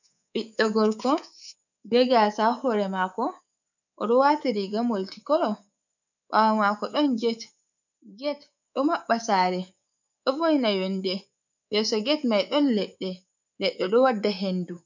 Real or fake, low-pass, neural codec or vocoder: fake; 7.2 kHz; codec, 24 kHz, 3.1 kbps, DualCodec